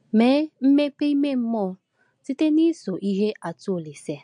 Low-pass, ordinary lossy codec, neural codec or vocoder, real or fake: 9.9 kHz; MP3, 48 kbps; none; real